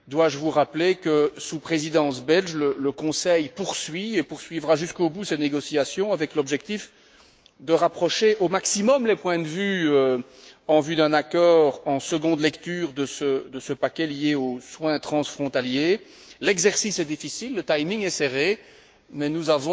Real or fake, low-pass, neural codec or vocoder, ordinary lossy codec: fake; none; codec, 16 kHz, 6 kbps, DAC; none